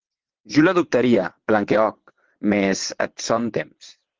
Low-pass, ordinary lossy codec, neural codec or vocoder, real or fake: 7.2 kHz; Opus, 16 kbps; vocoder, 22.05 kHz, 80 mel bands, WaveNeXt; fake